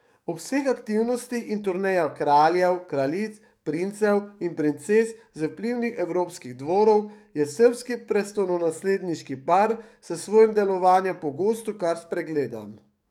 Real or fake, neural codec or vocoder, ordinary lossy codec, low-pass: fake; codec, 44.1 kHz, 7.8 kbps, DAC; none; 19.8 kHz